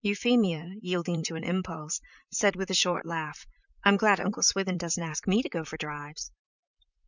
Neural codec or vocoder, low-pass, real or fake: vocoder, 44.1 kHz, 128 mel bands, Pupu-Vocoder; 7.2 kHz; fake